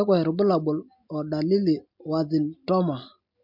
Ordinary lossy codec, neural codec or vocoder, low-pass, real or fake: MP3, 48 kbps; none; 5.4 kHz; real